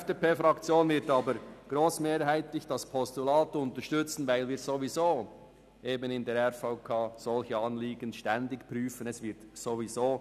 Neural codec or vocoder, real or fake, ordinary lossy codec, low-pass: none; real; none; 14.4 kHz